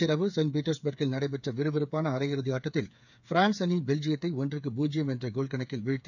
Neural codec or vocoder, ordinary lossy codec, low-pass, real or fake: codec, 16 kHz, 8 kbps, FreqCodec, smaller model; none; 7.2 kHz; fake